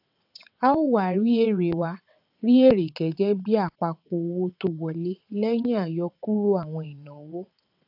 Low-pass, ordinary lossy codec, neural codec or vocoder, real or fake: 5.4 kHz; AAC, 48 kbps; vocoder, 22.05 kHz, 80 mel bands, WaveNeXt; fake